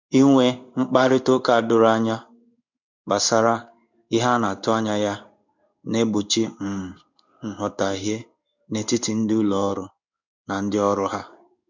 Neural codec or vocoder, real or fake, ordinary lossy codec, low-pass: codec, 16 kHz in and 24 kHz out, 1 kbps, XY-Tokenizer; fake; none; 7.2 kHz